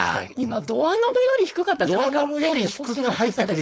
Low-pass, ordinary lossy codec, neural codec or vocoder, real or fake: none; none; codec, 16 kHz, 4.8 kbps, FACodec; fake